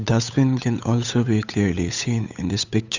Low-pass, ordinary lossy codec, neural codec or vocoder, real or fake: 7.2 kHz; none; codec, 16 kHz, 8 kbps, FunCodec, trained on Chinese and English, 25 frames a second; fake